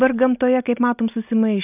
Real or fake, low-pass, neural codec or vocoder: real; 3.6 kHz; none